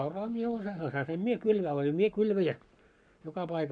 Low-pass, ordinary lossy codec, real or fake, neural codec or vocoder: 9.9 kHz; none; fake; codec, 44.1 kHz, 7.8 kbps, Pupu-Codec